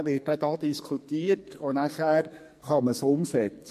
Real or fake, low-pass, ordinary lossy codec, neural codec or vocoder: fake; 14.4 kHz; MP3, 64 kbps; codec, 44.1 kHz, 2.6 kbps, SNAC